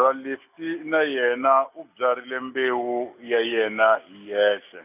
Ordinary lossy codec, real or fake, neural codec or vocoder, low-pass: none; real; none; 3.6 kHz